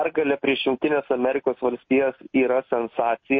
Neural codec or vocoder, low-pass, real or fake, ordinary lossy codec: none; 7.2 kHz; real; MP3, 32 kbps